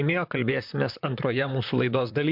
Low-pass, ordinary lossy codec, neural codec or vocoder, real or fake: 5.4 kHz; MP3, 48 kbps; vocoder, 44.1 kHz, 128 mel bands, Pupu-Vocoder; fake